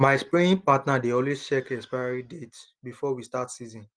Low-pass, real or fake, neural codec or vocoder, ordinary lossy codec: 9.9 kHz; real; none; Opus, 24 kbps